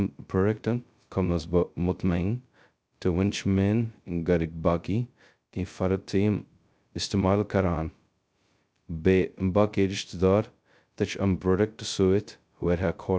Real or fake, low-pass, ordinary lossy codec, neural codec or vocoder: fake; none; none; codec, 16 kHz, 0.2 kbps, FocalCodec